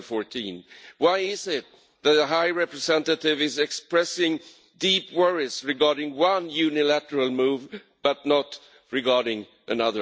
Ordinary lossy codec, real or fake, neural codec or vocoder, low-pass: none; real; none; none